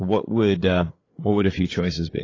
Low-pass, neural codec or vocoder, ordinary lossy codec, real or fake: 7.2 kHz; codec, 16 kHz, 8 kbps, FreqCodec, larger model; AAC, 32 kbps; fake